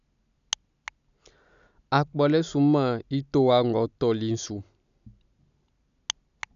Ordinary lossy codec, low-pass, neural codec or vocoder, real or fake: none; 7.2 kHz; none; real